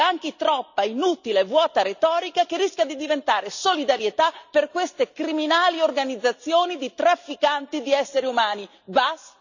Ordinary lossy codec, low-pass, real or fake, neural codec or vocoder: none; 7.2 kHz; real; none